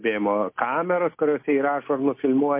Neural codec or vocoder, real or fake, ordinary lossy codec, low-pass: none; real; MP3, 24 kbps; 3.6 kHz